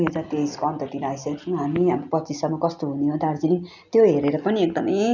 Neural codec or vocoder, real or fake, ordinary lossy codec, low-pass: none; real; none; 7.2 kHz